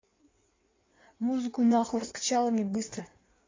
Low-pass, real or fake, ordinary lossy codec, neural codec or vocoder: 7.2 kHz; fake; AAC, 32 kbps; codec, 16 kHz in and 24 kHz out, 1.1 kbps, FireRedTTS-2 codec